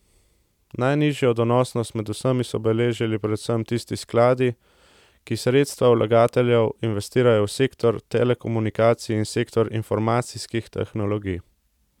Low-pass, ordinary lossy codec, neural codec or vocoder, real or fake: 19.8 kHz; none; none; real